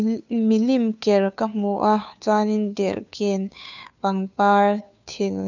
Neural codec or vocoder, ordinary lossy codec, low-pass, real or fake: codec, 16 kHz, 2 kbps, FunCodec, trained on Chinese and English, 25 frames a second; none; 7.2 kHz; fake